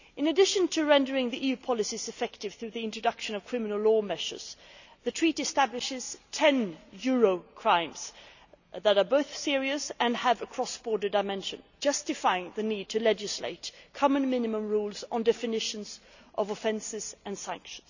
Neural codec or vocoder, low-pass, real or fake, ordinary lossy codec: none; 7.2 kHz; real; none